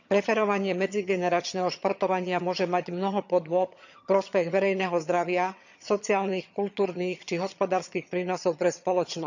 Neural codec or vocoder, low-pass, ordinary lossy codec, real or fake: vocoder, 22.05 kHz, 80 mel bands, HiFi-GAN; 7.2 kHz; none; fake